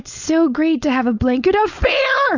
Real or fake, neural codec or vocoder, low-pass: fake; codec, 16 kHz, 4.8 kbps, FACodec; 7.2 kHz